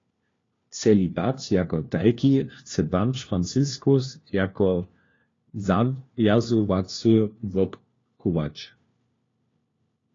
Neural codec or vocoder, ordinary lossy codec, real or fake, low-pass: codec, 16 kHz, 1 kbps, FunCodec, trained on LibriTTS, 50 frames a second; AAC, 32 kbps; fake; 7.2 kHz